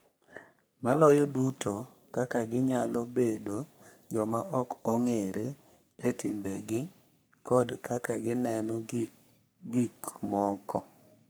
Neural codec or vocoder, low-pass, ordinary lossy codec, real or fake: codec, 44.1 kHz, 3.4 kbps, Pupu-Codec; none; none; fake